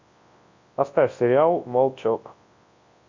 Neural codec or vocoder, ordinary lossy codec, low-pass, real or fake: codec, 24 kHz, 0.9 kbps, WavTokenizer, large speech release; MP3, 64 kbps; 7.2 kHz; fake